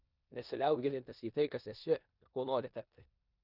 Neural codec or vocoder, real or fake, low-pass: codec, 16 kHz in and 24 kHz out, 0.9 kbps, LongCat-Audio-Codec, four codebook decoder; fake; 5.4 kHz